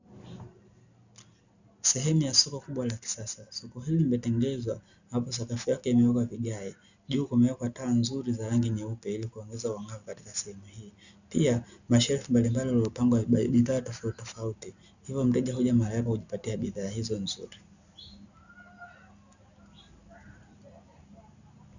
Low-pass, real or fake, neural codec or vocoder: 7.2 kHz; real; none